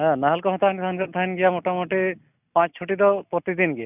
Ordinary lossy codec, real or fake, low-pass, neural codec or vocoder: none; real; 3.6 kHz; none